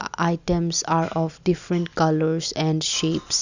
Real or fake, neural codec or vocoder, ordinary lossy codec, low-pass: real; none; none; 7.2 kHz